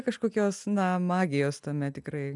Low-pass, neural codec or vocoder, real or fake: 10.8 kHz; none; real